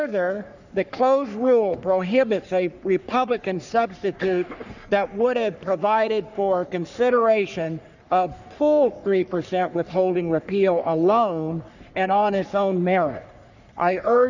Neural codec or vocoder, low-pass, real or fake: codec, 44.1 kHz, 3.4 kbps, Pupu-Codec; 7.2 kHz; fake